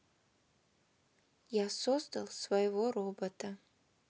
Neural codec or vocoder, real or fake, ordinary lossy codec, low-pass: none; real; none; none